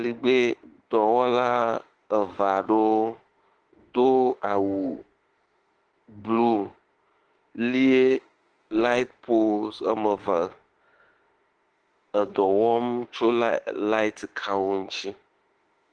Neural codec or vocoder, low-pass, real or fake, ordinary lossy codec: autoencoder, 48 kHz, 32 numbers a frame, DAC-VAE, trained on Japanese speech; 9.9 kHz; fake; Opus, 16 kbps